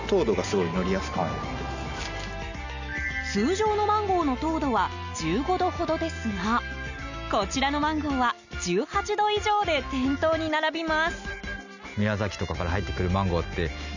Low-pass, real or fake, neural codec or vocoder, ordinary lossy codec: 7.2 kHz; real; none; none